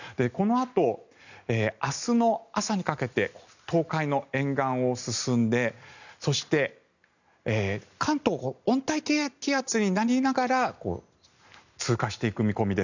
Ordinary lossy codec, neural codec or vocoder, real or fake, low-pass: none; none; real; 7.2 kHz